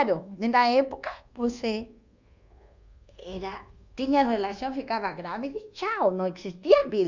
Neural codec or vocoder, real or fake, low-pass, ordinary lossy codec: codec, 24 kHz, 1.2 kbps, DualCodec; fake; 7.2 kHz; Opus, 64 kbps